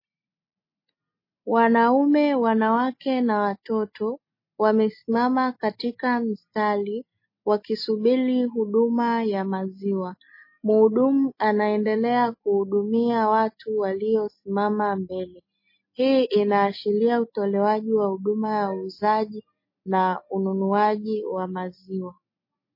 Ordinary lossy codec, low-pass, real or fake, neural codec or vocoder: MP3, 24 kbps; 5.4 kHz; real; none